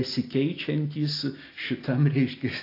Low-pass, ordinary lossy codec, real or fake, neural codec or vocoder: 5.4 kHz; AAC, 32 kbps; real; none